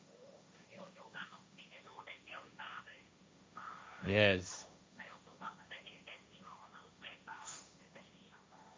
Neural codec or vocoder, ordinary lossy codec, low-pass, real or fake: codec, 16 kHz, 1.1 kbps, Voila-Tokenizer; none; none; fake